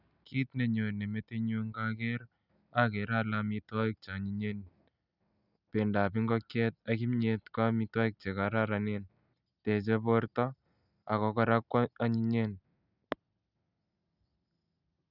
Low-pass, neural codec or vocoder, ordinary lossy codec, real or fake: 5.4 kHz; none; none; real